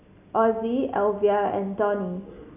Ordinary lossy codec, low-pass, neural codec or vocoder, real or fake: none; 3.6 kHz; none; real